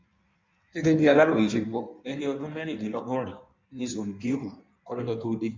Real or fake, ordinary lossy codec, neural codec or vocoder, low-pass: fake; MP3, 64 kbps; codec, 16 kHz in and 24 kHz out, 1.1 kbps, FireRedTTS-2 codec; 7.2 kHz